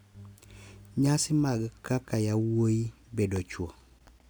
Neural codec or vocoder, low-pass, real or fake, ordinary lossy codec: none; none; real; none